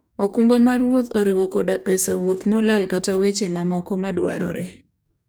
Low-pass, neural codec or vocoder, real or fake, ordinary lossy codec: none; codec, 44.1 kHz, 2.6 kbps, DAC; fake; none